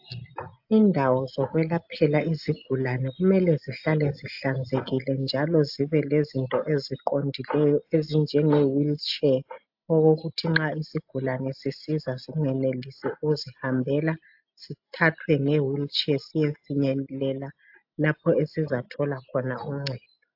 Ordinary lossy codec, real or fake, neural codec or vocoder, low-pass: AAC, 48 kbps; real; none; 5.4 kHz